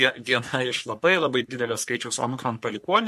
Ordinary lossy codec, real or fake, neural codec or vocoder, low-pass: MP3, 64 kbps; fake; codec, 44.1 kHz, 3.4 kbps, Pupu-Codec; 14.4 kHz